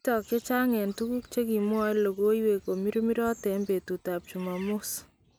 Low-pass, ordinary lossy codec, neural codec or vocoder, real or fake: none; none; none; real